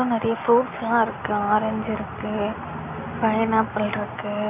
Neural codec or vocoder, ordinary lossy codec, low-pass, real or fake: none; none; 3.6 kHz; real